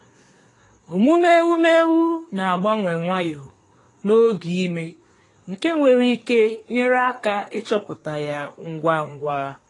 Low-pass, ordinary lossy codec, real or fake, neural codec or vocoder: 10.8 kHz; AAC, 32 kbps; fake; codec, 24 kHz, 1 kbps, SNAC